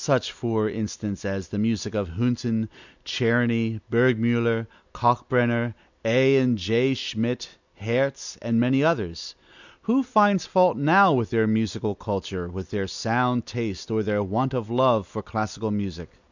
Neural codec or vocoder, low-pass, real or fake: none; 7.2 kHz; real